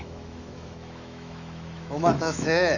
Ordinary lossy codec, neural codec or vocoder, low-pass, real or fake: none; autoencoder, 48 kHz, 128 numbers a frame, DAC-VAE, trained on Japanese speech; 7.2 kHz; fake